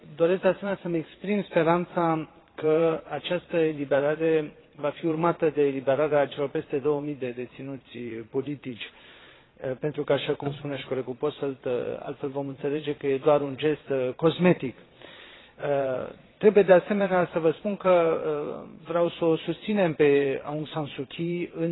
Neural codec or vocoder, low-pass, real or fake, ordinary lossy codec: vocoder, 22.05 kHz, 80 mel bands, Vocos; 7.2 kHz; fake; AAC, 16 kbps